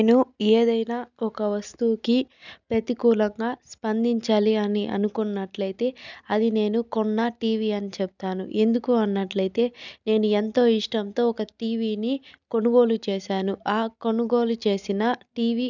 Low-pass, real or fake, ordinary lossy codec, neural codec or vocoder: 7.2 kHz; real; none; none